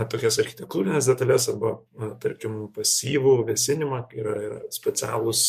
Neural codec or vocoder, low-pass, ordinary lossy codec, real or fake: codec, 44.1 kHz, 7.8 kbps, DAC; 14.4 kHz; MP3, 64 kbps; fake